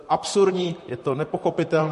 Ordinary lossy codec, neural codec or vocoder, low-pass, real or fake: MP3, 48 kbps; vocoder, 44.1 kHz, 128 mel bands, Pupu-Vocoder; 14.4 kHz; fake